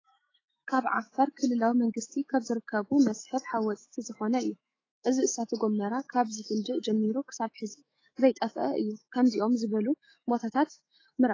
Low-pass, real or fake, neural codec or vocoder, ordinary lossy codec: 7.2 kHz; fake; autoencoder, 48 kHz, 128 numbers a frame, DAC-VAE, trained on Japanese speech; AAC, 32 kbps